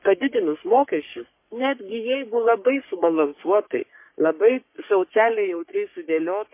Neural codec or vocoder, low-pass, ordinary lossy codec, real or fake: codec, 44.1 kHz, 3.4 kbps, Pupu-Codec; 3.6 kHz; MP3, 24 kbps; fake